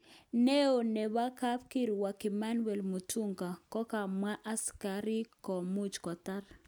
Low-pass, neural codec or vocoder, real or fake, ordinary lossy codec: none; none; real; none